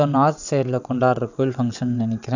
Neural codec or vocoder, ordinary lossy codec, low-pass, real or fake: vocoder, 22.05 kHz, 80 mel bands, WaveNeXt; none; 7.2 kHz; fake